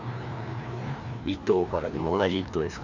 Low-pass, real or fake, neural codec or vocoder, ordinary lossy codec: 7.2 kHz; fake; codec, 16 kHz, 2 kbps, FreqCodec, larger model; none